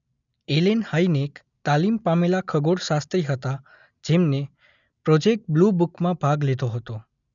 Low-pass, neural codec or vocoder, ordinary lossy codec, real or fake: 7.2 kHz; none; none; real